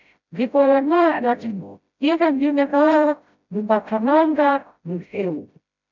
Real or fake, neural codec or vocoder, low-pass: fake; codec, 16 kHz, 0.5 kbps, FreqCodec, smaller model; 7.2 kHz